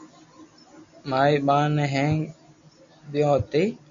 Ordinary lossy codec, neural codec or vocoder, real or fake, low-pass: MP3, 64 kbps; none; real; 7.2 kHz